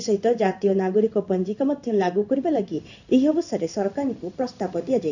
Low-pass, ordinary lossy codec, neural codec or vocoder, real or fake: 7.2 kHz; none; codec, 16 kHz in and 24 kHz out, 1 kbps, XY-Tokenizer; fake